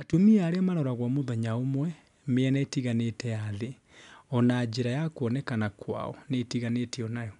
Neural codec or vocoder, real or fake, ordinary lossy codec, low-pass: none; real; MP3, 96 kbps; 10.8 kHz